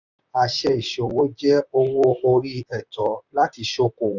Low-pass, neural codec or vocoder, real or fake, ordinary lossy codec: 7.2 kHz; vocoder, 44.1 kHz, 128 mel bands, Pupu-Vocoder; fake; none